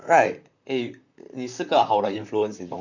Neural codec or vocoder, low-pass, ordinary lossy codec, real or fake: vocoder, 44.1 kHz, 128 mel bands, Pupu-Vocoder; 7.2 kHz; none; fake